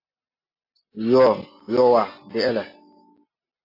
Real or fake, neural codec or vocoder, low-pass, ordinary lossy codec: real; none; 5.4 kHz; AAC, 32 kbps